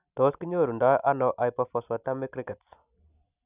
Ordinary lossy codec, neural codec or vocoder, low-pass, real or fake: none; none; 3.6 kHz; real